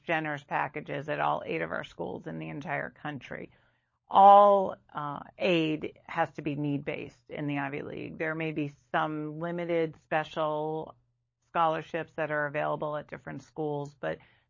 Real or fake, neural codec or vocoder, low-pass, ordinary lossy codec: fake; codec, 16 kHz, 16 kbps, FunCodec, trained on LibriTTS, 50 frames a second; 7.2 kHz; MP3, 32 kbps